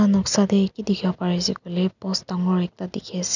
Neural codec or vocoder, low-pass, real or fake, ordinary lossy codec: none; 7.2 kHz; real; none